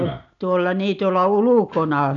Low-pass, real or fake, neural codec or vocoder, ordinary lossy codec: 7.2 kHz; real; none; none